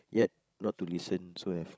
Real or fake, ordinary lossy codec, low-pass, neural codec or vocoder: fake; none; none; codec, 16 kHz, 16 kbps, FreqCodec, larger model